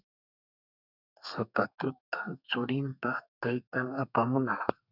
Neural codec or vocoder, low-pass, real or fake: codec, 32 kHz, 1.9 kbps, SNAC; 5.4 kHz; fake